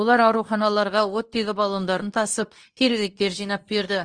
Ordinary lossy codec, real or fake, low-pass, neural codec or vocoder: Opus, 24 kbps; fake; 9.9 kHz; codec, 24 kHz, 0.9 kbps, WavTokenizer, medium speech release version 2